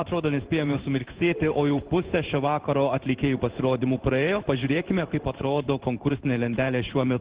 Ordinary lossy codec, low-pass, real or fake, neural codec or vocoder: Opus, 16 kbps; 3.6 kHz; fake; codec, 16 kHz in and 24 kHz out, 1 kbps, XY-Tokenizer